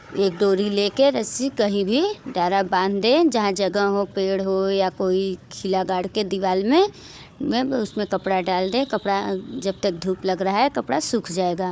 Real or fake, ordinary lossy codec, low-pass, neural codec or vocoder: fake; none; none; codec, 16 kHz, 4 kbps, FunCodec, trained on Chinese and English, 50 frames a second